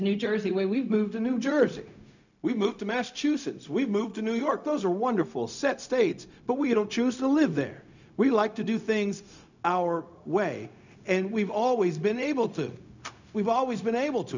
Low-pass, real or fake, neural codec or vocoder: 7.2 kHz; fake; codec, 16 kHz, 0.4 kbps, LongCat-Audio-Codec